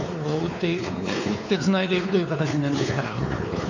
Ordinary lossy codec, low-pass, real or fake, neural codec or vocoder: none; 7.2 kHz; fake; codec, 16 kHz, 4 kbps, X-Codec, WavLM features, trained on Multilingual LibriSpeech